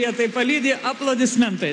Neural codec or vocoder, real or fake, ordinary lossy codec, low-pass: none; real; AAC, 48 kbps; 10.8 kHz